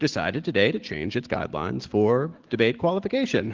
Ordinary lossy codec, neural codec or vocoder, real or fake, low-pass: Opus, 16 kbps; none; real; 7.2 kHz